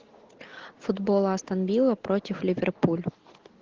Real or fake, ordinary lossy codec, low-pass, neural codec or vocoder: real; Opus, 16 kbps; 7.2 kHz; none